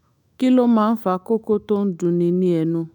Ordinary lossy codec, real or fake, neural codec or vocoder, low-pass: none; fake; autoencoder, 48 kHz, 128 numbers a frame, DAC-VAE, trained on Japanese speech; 19.8 kHz